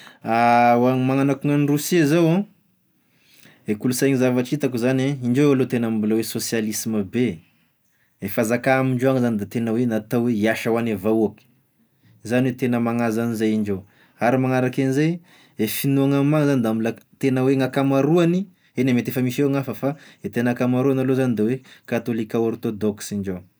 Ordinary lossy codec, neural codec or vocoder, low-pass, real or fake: none; none; none; real